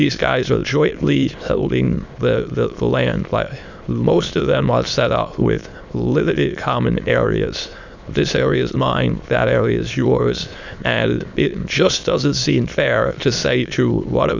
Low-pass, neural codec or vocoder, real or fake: 7.2 kHz; autoencoder, 22.05 kHz, a latent of 192 numbers a frame, VITS, trained on many speakers; fake